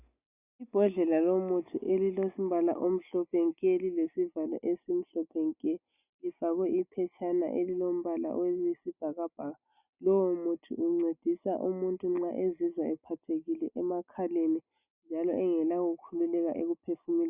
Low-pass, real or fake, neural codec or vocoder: 3.6 kHz; real; none